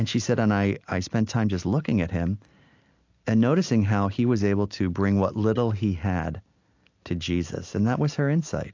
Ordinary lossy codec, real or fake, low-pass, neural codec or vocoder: AAC, 48 kbps; real; 7.2 kHz; none